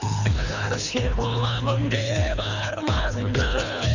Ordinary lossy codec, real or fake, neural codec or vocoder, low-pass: none; fake; codec, 24 kHz, 3 kbps, HILCodec; 7.2 kHz